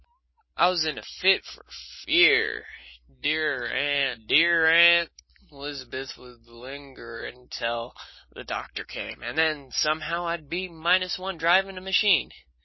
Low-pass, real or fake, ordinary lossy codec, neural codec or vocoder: 7.2 kHz; real; MP3, 24 kbps; none